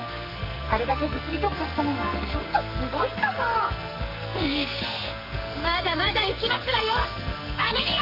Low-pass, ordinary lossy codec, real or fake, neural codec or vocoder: 5.4 kHz; none; fake; codec, 44.1 kHz, 2.6 kbps, SNAC